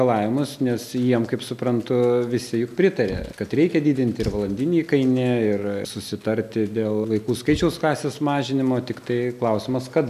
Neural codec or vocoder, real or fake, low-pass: none; real; 14.4 kHz